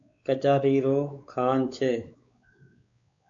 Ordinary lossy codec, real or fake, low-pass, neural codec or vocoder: AAC, 64 kbps; fake; 7.2 kHz; codec, 16 kHz, 4 kbps, X-Codec, WavLM features, trained on Multilingual LibriSpeech